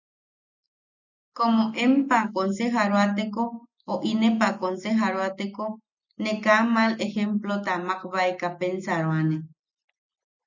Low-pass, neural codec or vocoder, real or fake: 7.2 kHz; none; real